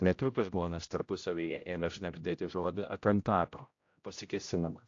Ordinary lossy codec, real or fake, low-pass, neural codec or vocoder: AAC, 48 kbps; fake; 7.2 kHz; codec, 16 kHz, 0.5 kbps, X-Codec, HuBERT features, trained on general audio